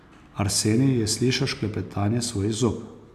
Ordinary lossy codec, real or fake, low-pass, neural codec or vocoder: MP3, 96 kbps; real; 14.4 kHz; none